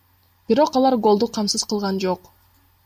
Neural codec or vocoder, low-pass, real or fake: none; 14.4 kHz; real